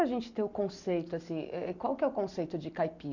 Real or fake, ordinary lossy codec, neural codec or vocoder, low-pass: real; none; none; 7.2 kHz